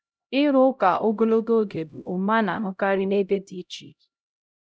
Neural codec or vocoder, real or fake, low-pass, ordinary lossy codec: codec, 16 kHz, 0.5 kbps, X-Codec, HuBERT features, trained on LibriSpeech; fake; none; none